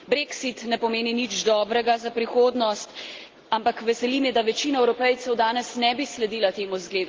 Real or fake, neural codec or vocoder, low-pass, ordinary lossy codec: real; none; 7.2 kHz; Opus, 16 kbps